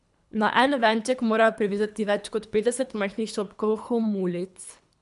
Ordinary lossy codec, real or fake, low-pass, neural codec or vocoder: none; fake; 10.8 kHz; codec, 24 kHz, 3 kbps, HILCodec